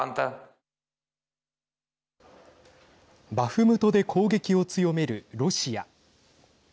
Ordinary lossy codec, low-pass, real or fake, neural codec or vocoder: none; none; real; none